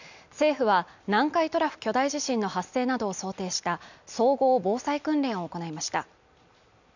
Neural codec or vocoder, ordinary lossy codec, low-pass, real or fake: none; none; 7.2 kHz; real